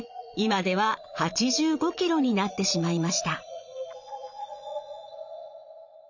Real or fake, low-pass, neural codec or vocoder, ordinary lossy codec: fake; 7.2 kHz; vocoder, 44.1 kHz, 80 mel bands, Vocos; none